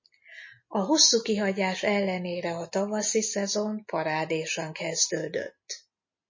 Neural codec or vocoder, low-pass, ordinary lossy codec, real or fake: none; 7.2 kHz; MP3, 32 kbps; real